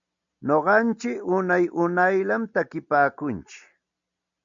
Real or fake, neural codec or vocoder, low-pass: real; none; 7.2 kHz